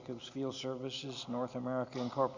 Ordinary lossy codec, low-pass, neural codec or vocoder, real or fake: Opus, 64 kbps; 7.2 kHz; none; real